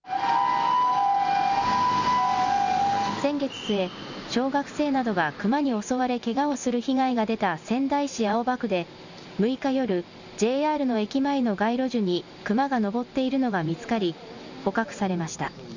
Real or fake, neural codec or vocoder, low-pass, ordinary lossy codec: real; none; 7.2 kHz; none